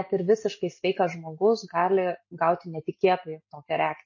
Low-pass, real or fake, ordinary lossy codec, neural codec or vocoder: 7.2 kHz; real; MP3, 32 kbps; none